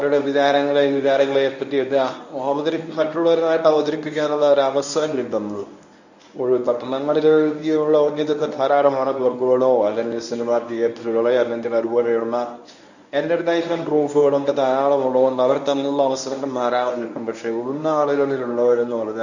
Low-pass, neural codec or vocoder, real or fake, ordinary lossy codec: 7.2 kHz; codec, 24 kHz, 0.9 kbps, WavTokenizer, medium speech release version 1; fake; none